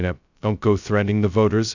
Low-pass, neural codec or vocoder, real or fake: 7.2 kHz; codec, 16 kHz, 0.2 kbps, FocalCodec; fake